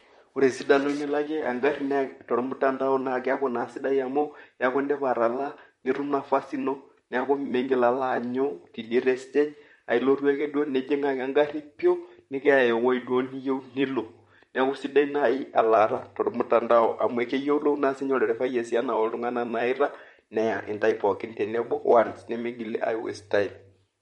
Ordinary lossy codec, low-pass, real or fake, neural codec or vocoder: MP3, 48 kbps; 19.8 kHz; fake; vocoder, 44.1 kHz, 128 mel bands, Pupu-Vocoder